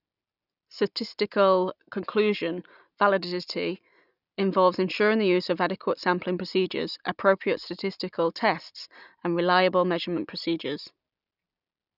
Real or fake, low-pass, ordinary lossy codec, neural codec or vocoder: real; 5.4 kHz; none; none